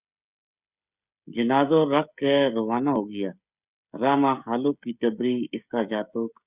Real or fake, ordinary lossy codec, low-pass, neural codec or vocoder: fake; Opus, 64 kbps; 3.6 kHz; codec, 16 kHz, 16 kbps, FreqCodec, smaller model